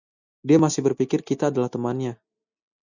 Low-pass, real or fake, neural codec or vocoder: 7.2 kHz; real; none